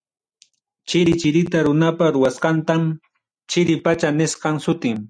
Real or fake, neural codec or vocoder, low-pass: real; none; 9.9 kHz